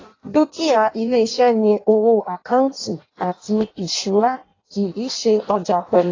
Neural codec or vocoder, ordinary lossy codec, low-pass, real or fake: codec, 16 kHz in and 24 kHz out, 0.6 kbps, FireRedTTS-2 codec; AAC, 32 kbps; 7.2 kHz; fake